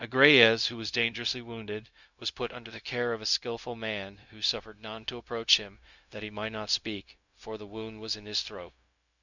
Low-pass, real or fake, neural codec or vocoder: 7.2 kHz; fake; codec, 16 kHz, 0.4 kbps, LongCat-Audio-Codec